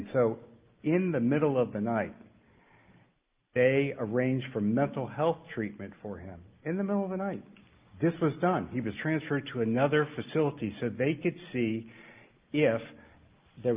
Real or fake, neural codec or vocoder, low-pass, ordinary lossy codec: real; none; 3.6 kHz; Opus, 24 kbps